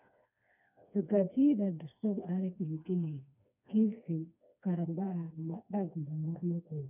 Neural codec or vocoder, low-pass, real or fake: codec, 16 kHz, 2 kbps, FreqCodec, smaller model; 3.6 kHz; fake